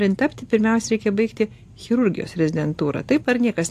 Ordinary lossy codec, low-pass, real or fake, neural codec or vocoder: AAC, 64 kbps; 14.4 kHz; real; none